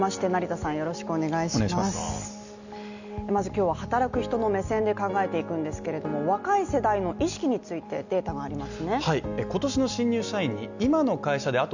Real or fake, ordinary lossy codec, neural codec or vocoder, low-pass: real; none; none; 7.2 kHz